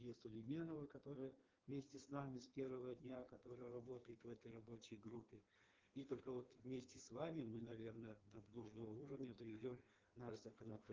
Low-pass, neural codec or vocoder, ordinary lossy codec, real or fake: 7.2 kHz; codec, 16 kHz in and 24 kHz out, 1.1 kbps, FireRedTTS-2 codec; Opus, 16 kbps; fake